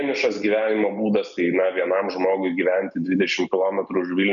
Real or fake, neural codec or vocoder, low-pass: real; none; 7.2 kHz